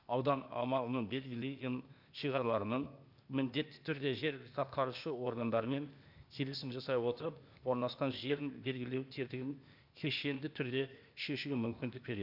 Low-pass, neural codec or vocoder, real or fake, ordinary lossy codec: 5.4 kHz; codec, 16 kHz, 0.8 kbps, ZipCodec; fake; none